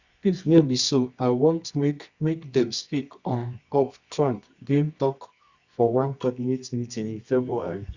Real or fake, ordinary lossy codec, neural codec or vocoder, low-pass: fake; Opus, 64 kbps; codec, 24 kHz, 0.9 kbps, WavTokenizer, medium music audio release; 7.2 kHz